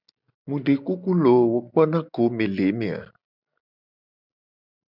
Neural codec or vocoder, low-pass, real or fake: none; 5.4 kHz; real